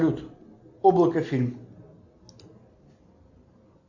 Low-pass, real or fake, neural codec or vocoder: 7.2 kHz; real; none